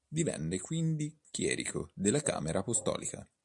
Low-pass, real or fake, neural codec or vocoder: 10.8 kHz; real; none